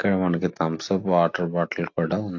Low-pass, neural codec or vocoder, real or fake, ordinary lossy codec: 7.2 kHz; none; real; AAC, 48 kbps